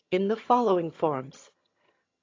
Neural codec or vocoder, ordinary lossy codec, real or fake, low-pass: vocoder, 22.05 kHz, 80 mel bands, HiFi-GAN; AAC, 32 kbps; fake; 7.2 kHz